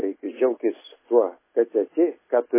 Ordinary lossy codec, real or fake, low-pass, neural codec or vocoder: MP3, 16 kbps; real; 3.6 kHz; none